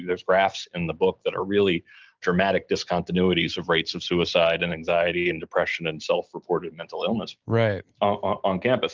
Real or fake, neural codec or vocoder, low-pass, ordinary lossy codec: real; none; 7.2 kHz; Opus, 32 kbps